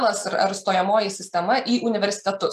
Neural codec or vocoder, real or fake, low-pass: none; real; 14.4 kHz